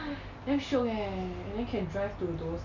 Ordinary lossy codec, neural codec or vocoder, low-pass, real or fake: none; none; 7.2 kHz; real